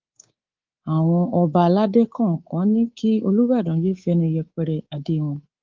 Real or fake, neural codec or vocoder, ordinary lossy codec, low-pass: fake; codec, 24 kHz, 3.1 kbps, DualCodec; Opus, 32 kbps; 7.2 kHz